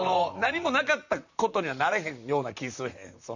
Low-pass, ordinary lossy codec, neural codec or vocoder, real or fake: 7.2 kHz; none; vocoder, 44.1 kHz, 128 mel bands, Pupu-Vocoder; fake